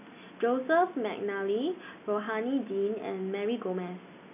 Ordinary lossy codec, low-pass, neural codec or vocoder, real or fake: none; 3.6 kHz; none; real